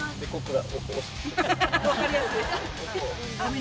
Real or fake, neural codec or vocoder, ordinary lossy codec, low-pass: real; none; none; none